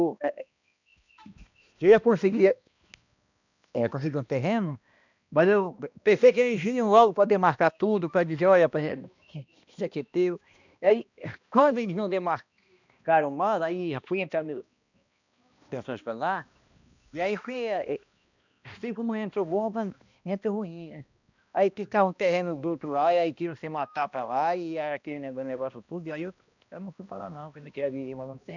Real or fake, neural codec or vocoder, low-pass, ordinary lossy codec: fake; codec, 16 kHz, 1 kbps, X-Codec, HuBERT features, trained on balanced general audio; 7.2 kHz; none